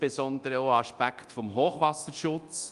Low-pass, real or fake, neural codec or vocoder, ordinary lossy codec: 10.8 kHz; fake; codec, 24 kHz, 0.9 kbps, DualCodec; Opus, 32 kbps